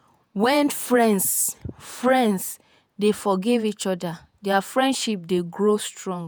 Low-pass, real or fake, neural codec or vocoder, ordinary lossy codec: none; fake; vocoder, 48 kHz, 128 mel bands, Vocos; none